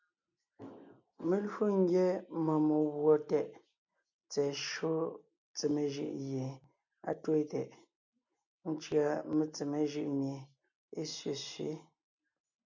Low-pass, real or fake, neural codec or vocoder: 7.2 kHz; real; none